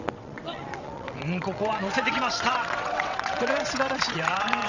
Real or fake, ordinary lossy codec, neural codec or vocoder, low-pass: fake; none; vocoder, 22.05 kHz, 80 mel bands, WaveNeXt; 7.2 kHz